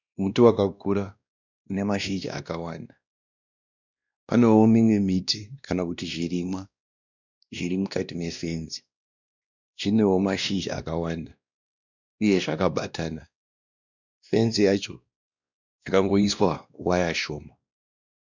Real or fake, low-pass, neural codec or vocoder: fake; 7.2 kHz; codec, 16 kHz, 1 kbps, X-Codec, WavLM features, trained on Multilingual LibriSpeech